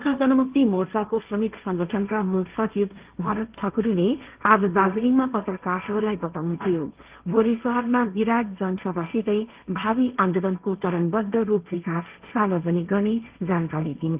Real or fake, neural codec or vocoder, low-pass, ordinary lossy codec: fake; codec, 16 kHz, 1.1 kbps, Voila-Tokenizer; 3.6 kHz; Opus, 32 kbps